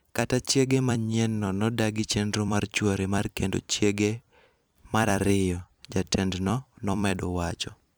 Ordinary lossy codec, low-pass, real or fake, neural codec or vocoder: none; none; fake; vocoder, 44.1 kHz, 128 mel bands every 256 samples, BigVGAN v2